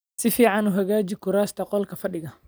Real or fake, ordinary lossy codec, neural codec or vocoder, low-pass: real; none; none; none